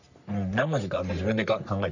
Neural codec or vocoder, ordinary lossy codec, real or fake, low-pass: codec, 44.1 kHz, 3.4 kbps, Pupu-Codec; none; fake; 7.2 kHz